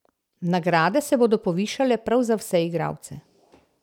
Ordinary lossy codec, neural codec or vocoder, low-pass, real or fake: none; none; 19.8 kHz; real